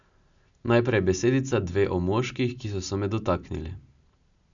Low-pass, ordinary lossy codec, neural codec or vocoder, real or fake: 7.2 kHz; none; none; real